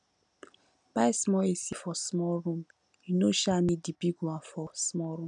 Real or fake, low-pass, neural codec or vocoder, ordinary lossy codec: real; 9.9 kHz; none; none